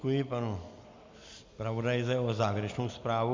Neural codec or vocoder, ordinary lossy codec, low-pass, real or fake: none; MP3, 64 kbps; 7.2 kHz; real